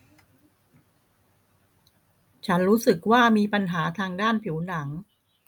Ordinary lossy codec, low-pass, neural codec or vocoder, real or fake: none; 19.8 kHz; none; real